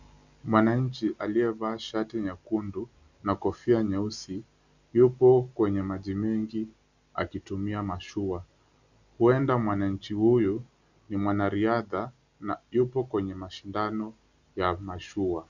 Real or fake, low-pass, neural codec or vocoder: real; 7.2 kHz; none